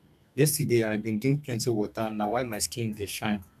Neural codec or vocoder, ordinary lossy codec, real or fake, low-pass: codec, 32 kHz, 1.9 kbps, SNAC; none; fake; 14.4 kHz